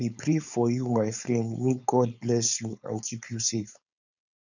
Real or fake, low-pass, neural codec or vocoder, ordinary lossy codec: fake; 7.2 kHz; codec, 16 kHz, 4.8 kbps, FACodec; none